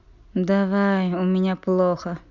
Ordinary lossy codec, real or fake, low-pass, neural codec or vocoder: none; real; 7.2 kHz; none